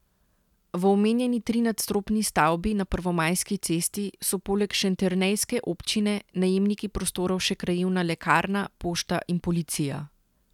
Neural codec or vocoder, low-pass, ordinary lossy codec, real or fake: none; 19.8 kHz; none; real